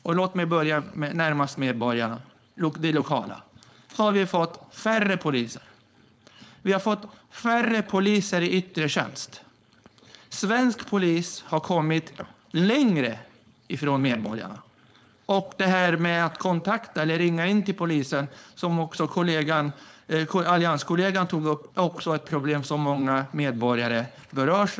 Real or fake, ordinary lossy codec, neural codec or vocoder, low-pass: fake; none; codec, 16 kHz, 4.8 kbps, FACodec; none